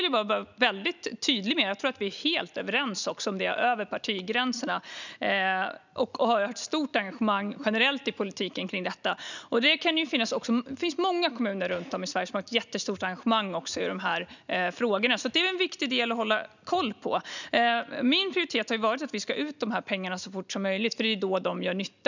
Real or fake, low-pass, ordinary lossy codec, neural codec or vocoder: real; 7.2 kHz; none; none